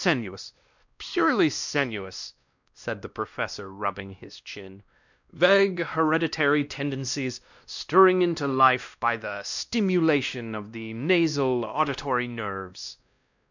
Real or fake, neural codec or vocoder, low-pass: fake; codec, 16 kHz, 1 kbps, X-Codec, WavLM features, trained on Multilingual LibriSpeech; 7.2 kHz